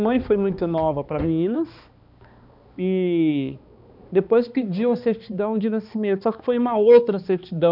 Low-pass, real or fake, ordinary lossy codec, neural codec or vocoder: 5.4 kHz; fake; none; codec, 16 kHz, 2 kbps, X-Codec, HuBERT features, trained on balanced general audio